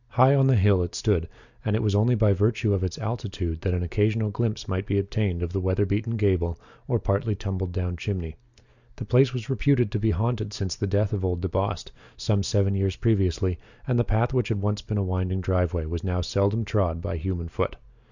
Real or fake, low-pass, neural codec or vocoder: real; 7.2 kHz; none